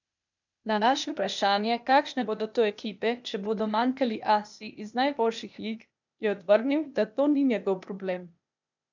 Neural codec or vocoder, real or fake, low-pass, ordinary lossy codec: codec, 16 kHz, 0.8 kbps, ZipCodec; fake; 7.2 kHz; none